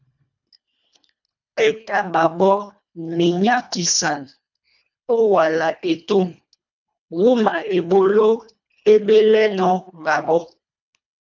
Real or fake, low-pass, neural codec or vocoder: fake; 7.2 kHz; codec, 24 kHz, 1.5 kbps, HILCodec